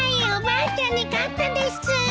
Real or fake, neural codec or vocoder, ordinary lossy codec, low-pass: real; none; none; none